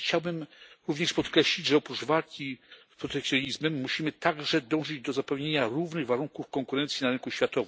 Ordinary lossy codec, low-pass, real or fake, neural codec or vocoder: none; none; real; none